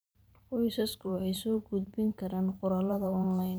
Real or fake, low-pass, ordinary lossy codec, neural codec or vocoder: fake; none; none; vocoder, 44.1 kHz, 128 mel bands every 512 samples, BigVGAN v2